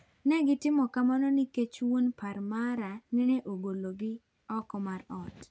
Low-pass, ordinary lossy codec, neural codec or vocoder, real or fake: none; none; none; real